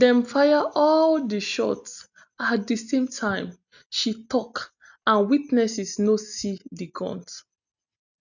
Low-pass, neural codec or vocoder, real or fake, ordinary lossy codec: 7.2 kHz; none; real; none